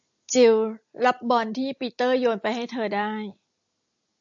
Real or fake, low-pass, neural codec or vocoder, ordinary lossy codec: real; 7.2 kHz; none; AAC, 64 kbps